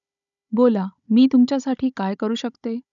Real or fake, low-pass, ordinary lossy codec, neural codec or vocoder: fake; 7.2 kHz; none; codec, 16 kHz, 16 kbps, FunCodec, trained on Chinese and English, 50 frames a second